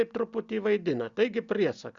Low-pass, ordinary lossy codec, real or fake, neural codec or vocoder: 7.2 kHz; Opus, 64 kbps; real; none